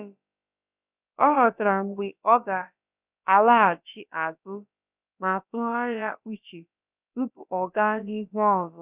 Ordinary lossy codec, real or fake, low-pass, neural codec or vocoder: none; fake; 3.6 kHz; codec, 16 kHz, about 1 kbps, DyCAST, with the encoder's durations